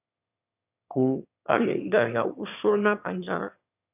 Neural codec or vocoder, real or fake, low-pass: autoencoder, 22.05 kHz, a latent of 192 numbers a frame, VITS, trained on one speaker; fake; 3.6 kHz